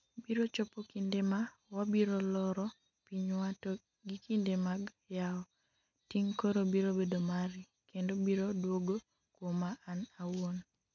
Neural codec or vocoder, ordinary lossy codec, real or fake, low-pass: none; none; real; 7.2 kHz